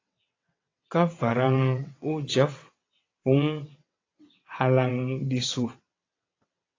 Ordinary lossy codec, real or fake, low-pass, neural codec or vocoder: AAC, 32 kbps; fake; 7.2 kHz; vocoder, 22.05 kHz, 80 mel bands, WaveNeXt